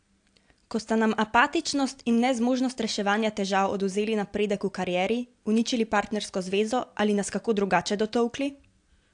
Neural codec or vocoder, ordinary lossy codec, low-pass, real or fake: none; AAC, 64 kbps; 9.9 kHz; real